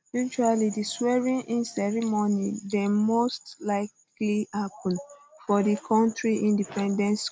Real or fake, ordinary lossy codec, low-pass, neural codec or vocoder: real; none; none; none